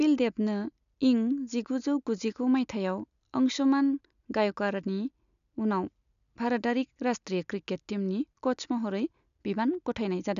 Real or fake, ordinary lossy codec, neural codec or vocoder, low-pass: real; none; none; 7.2 kHz